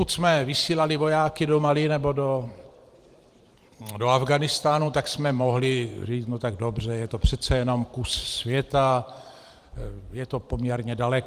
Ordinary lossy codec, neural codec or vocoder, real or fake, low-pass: Opus, 24 kbps; vocoder, 44.1 kHz, 128 mel bands every 512 samples, BigVGAN v2; fake; 14.4 kHz